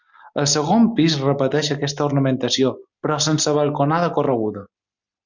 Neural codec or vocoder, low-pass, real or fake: none; 7.2 kHz; real